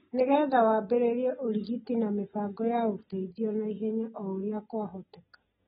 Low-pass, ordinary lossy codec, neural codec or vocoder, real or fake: 19.8 kHz; AAC, 16 kbps; none; real